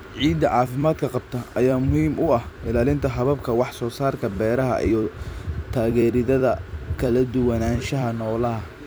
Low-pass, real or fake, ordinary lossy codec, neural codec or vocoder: none; fake; none; vocoder, 44.1 kHz, 128 mel bands every 256 samples, BigVGAN v2